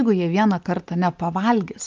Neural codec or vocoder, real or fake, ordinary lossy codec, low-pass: none; real; Opus, 24 kbps; 7.2 kHz